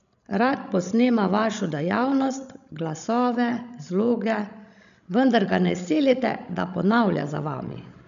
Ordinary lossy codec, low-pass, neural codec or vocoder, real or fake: none; 7.2 kHz; codec, 16 kHz, 16 kbps, FreqCodec, larger model; fake